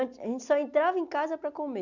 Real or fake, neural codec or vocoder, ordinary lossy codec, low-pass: real; none; none; 7.2 kHz